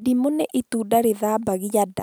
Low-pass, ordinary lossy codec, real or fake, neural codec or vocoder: none; none; real; none